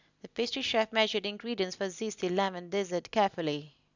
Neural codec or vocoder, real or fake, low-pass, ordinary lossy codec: none; real; 7.2 kHz; none